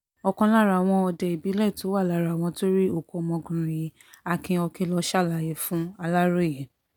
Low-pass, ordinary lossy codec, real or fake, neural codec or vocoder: none; none; real; none